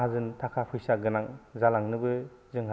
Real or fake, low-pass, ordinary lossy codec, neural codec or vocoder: real; none; none; none